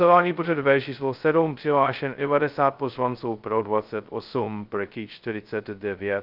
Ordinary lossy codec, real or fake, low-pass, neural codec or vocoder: Opus, 24 kbps; fake; 5.4 kHz; codec, 16 kHz, 0.2 kbps, FocalCodec